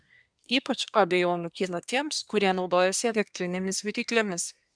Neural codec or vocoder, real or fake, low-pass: codec, 24 kHz, 1 kbps, SNAC; fake; 9.9 kHz